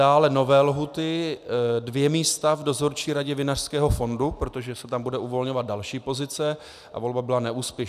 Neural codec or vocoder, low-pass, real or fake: none; 14.4 kHz; real